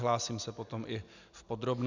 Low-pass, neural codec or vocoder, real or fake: 7.2 kHz; none; real